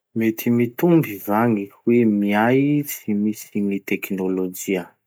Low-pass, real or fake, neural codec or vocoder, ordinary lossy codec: none; real; none; none